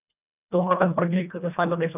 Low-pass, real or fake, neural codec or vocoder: 3.6 kHz; fake; codec, 24 kHz, 1.5 kbps, HILCodec